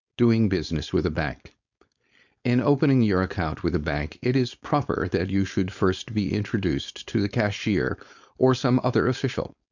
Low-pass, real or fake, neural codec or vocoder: 7.2 kHz; fake; codec, 16 kHz, 4.8 kbps, FACodec